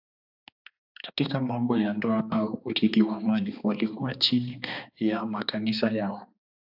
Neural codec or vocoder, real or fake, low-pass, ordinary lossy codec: codec, 16 kHz, 2 kbps, X-Codec, HuBERT features, trained on balanced general audio; fake; 5.4 kHz; AAC, 48 kbps